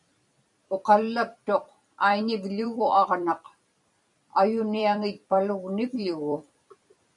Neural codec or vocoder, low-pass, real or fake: vocoder, 44.1 kHz, 128 mel bands every 256 samples, BigVGAN v2; 10.8 kHz; fake